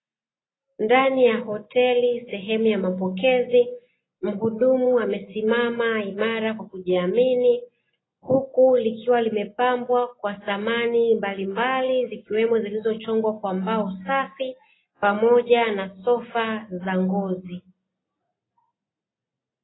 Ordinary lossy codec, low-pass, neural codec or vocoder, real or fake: AAC, 16 kbps; 7.2 kHz; none; real